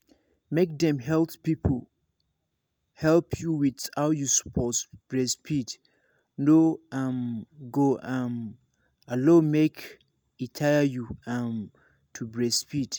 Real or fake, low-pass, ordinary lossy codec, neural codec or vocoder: real; none; none; none